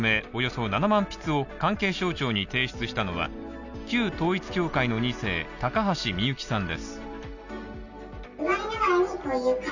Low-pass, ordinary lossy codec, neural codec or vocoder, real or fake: 7.2 kHz; none; none; real